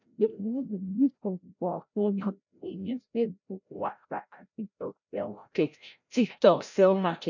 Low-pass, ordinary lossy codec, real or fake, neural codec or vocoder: 7.2 kHz; none; fake; codec, 16 kHz, 0.5 kbps, FreqCodec, larger model